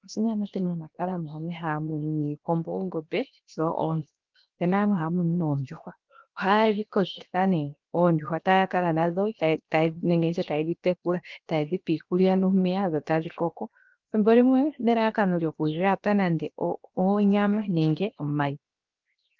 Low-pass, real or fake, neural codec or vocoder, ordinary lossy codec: 7.2 kHz; fake; codec, 16 kHz, 0.7 kbps, FocalCodec; Opus, 32 kbps